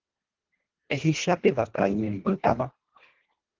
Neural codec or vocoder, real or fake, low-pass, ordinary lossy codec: codec, 24 kHz, 1.5 kbps, HILCodec; fake; 7.2 kHz; Opus, 16 kbps